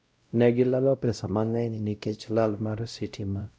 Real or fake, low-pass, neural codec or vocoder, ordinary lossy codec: fake; none; codec, 16 kHz, 0.5 kbps, X-Codec, WavLM features, trained on Multilingual LibriSpeech; none